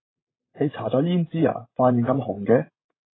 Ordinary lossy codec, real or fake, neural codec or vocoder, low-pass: AAC, 16 kbps; fake; vocoder, 44.1 kHz, 128 mel bands, Pupu-Vocoder; 7.2 kHz